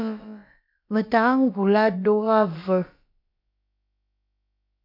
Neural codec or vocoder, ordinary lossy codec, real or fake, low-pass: codec, 16 kHz, about 1 kbps, DyCAST, with the encoder's durations; MP3, 48 kbps; fake; 5.4 kHz